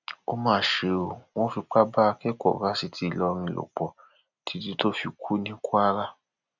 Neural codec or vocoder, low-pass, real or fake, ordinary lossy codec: none; 7.2 kHz; real; none